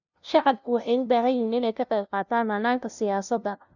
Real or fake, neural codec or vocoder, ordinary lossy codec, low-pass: fake; codec, 16 kHz, 0.5 kbps, FunCodec, trained on LibriTTS, 25 frames a second; none; 7.2 kHz